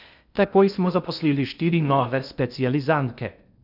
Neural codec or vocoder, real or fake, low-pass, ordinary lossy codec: codec, 16 kHz in and 24 kHz out, 0.6 kbps, FocalCodec, streaming, 2048 codes; fake; 5.4 kHz; none